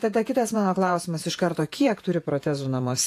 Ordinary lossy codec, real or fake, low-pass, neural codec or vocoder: AAC, 64 kbps; fake; 14.4 kHz; vocoder, 48 kHz, 128 mel bands, Vocos